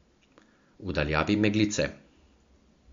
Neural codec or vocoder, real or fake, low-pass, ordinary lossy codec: none; real; 7.2 kHz; MP3, 48 kbps